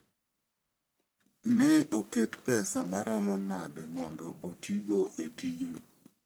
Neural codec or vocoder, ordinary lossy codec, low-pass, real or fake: codec, 44.1 kHz, 1.7 kbps, Pupu-Codec; none; none; fake